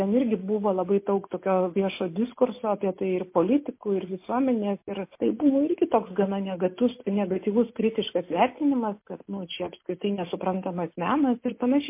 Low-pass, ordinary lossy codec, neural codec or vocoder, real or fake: 3.6 kHz; MP3, 24 kbps; none; real